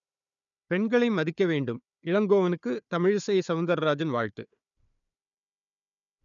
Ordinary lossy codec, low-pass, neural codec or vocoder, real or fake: none; 7.2 kHz; codec, 16 kHz, 4 kbps, FunCodec, trained on Chinese and English, 50 frames a second; fake